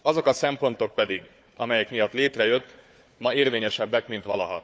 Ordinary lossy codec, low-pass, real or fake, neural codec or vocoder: none; none; fake; codec, 16 kHz, 4 kbps, FunCodec, trained on Chinese and English, 50 frames a second